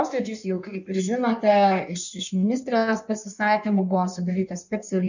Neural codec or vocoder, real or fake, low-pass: codec, 16 kHz in and 24 kHz out, 1.1 kbps, FireRedTTS-2 codec; fake; 7.2 kHz